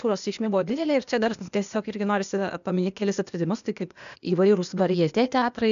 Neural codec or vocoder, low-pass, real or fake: codec, 16 kHz, 0.8 kbps, ZipCodec; 7.2 kHz; fake